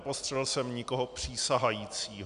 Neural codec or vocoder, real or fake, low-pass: none; real; 10.8 kHz